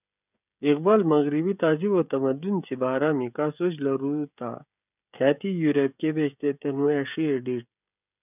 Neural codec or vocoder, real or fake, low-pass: codec, 16 kHz, 16 kbps, FreqCodec, smaller model; fake; 3.6 kHz